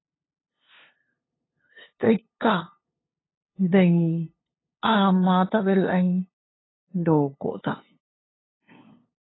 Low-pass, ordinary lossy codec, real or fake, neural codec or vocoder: 7.2 kHz; AAC, 16 kbps; fake; codec, 16 kHz, 2 kbps, FunCodec, trained on LibriTTS, 25 frames a second